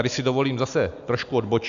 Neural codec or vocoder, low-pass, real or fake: none; 7.2 kHz; real